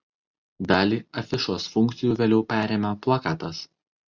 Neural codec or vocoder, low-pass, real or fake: none; 7.2 kHz; real